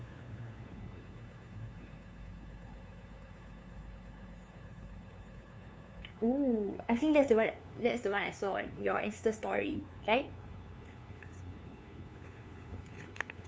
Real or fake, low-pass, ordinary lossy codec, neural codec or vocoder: fake; none; none; codec, 16 kHz, 2 kbps, FunCodec, trained on LibriTTS, 25 frames a second